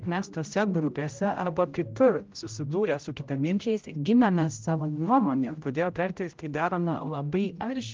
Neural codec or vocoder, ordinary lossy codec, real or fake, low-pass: codec, 16 kHz, 0.5 kbps, X-Codec, HuBERT features, trained on general audio; Opus, 24 kbps; fake; 7.2 kHz